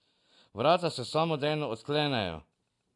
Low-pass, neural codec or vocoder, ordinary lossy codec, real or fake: 10.8 kHz; none; AAC, 64 kbps; real